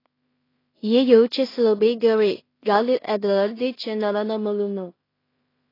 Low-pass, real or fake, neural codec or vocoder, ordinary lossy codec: 5.4 kHz; fake; codec, 16 kHz in and 24 kHz out, 0.4 kbps, LongCat-Audio-Codec, two codebook decoder; AAC, 24 kbps